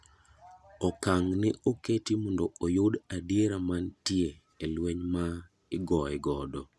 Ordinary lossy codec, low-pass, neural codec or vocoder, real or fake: none; none; none; real